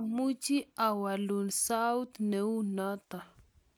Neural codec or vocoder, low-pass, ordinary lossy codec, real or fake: none; none; none; real